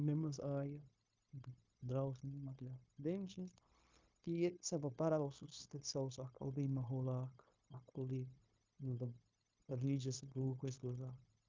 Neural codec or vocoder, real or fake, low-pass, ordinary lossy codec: codec, 16 kHz, 0.9 kbps, LongCat-Audio-Codec; fake; 7.2 kHz; Opus, 24 kbps